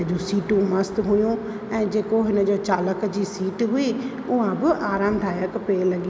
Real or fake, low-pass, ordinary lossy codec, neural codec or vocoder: real; none; none; none